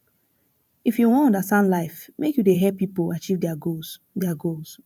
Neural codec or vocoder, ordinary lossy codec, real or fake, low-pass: vocoder, 44.1 kHz, 128 mel bands every 512 samples, BigVGAN v2; none; fake; 19.8 kHz